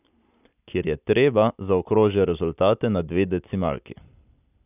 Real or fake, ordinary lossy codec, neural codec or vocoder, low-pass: real; none; none; 3.6 kHz